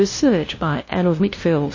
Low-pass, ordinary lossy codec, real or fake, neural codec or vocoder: 7.2 kHz; MP3, 32 kbps; fake; codec, 16 kHz, 0.5 kbps, FunCodec, trained on LibriTTS, 25 frames a second